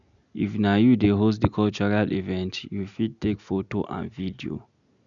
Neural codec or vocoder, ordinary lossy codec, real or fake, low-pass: none; none; real; 7.2 kHz